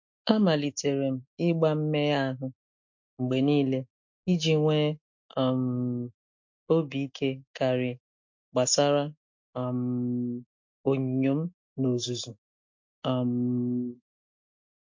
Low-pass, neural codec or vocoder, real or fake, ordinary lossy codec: 7.2 kHz; none; real; MP3, 48 kbps